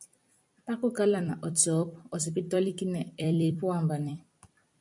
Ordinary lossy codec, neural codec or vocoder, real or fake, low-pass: MP3, 96 kbps; vocoder, 44.1 kHz, 128 mel bands every 256 samples, BigVGAN v2; fake; 10.8 kHz